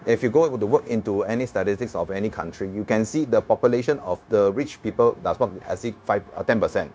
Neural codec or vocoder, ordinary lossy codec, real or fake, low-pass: codec, 16 kHz, 0.9 kbps, LongCat-Audio-Codec; none; fake; none